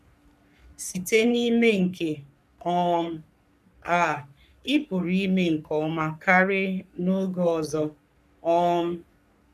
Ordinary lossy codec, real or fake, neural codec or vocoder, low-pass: none; fake; codec, 44.1 kHz, 3.4 kbps, Pupu-Codec; 14.4 kHz